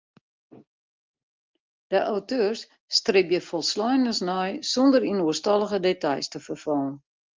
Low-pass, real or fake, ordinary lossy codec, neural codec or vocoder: 7.2 kHz; real; Opus, 32 kbps; none